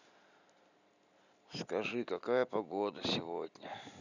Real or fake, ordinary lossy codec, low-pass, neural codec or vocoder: real; none; 7.2 kHz; none